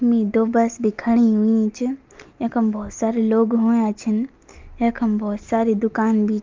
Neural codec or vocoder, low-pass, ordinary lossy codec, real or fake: none; 7.2 kHz; Opus, 24 kbps; real